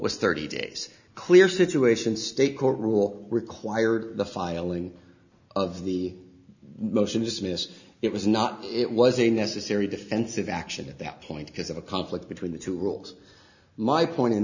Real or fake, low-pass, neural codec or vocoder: real; 7.2 kHz; none